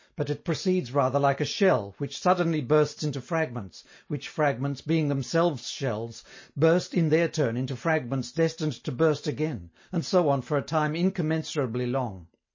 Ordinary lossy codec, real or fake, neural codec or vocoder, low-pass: MP3, 32 kbps; real; none; 7.2 kHz